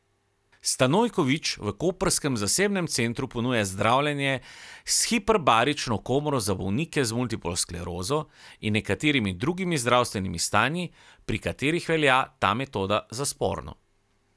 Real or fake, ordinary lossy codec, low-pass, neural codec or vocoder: real; none; none; none